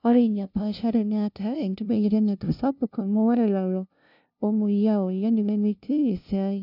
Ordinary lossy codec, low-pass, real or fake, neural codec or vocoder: none; 5.4 kHz; fake; codec, 16 kHz, 0.5 kbps, FunCodec, trained on LibriTTS, 25 frames a second